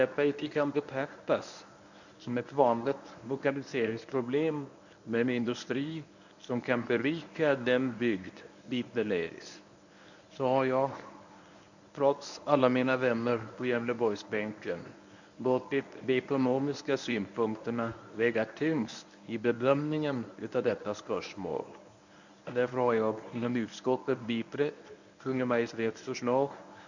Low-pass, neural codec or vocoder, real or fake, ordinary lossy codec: 7.2 kHz; codec, 24 kHz, 0.9 kbps, WavTokenizer, medium speech release version 1; fake; none